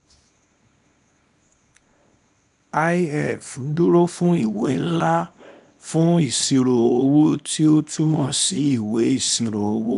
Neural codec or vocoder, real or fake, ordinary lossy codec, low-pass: codec, 24 kHz, 0.9 kbps, WavTokenizer, small release; fake; none; 10.8 kHz